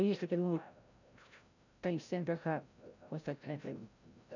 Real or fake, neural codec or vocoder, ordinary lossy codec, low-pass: fake; codec, 16 kHz, 0.5 kbps, FreqCodec, larger model; none; 7.2 kHz